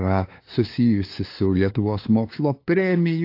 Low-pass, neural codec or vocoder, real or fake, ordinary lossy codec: 5.4 kHz; codec, 16 kHz, 2 kbps, FunCodec, trained on LibriTTS, 25 frames a second; fake; AAC, 32 kbps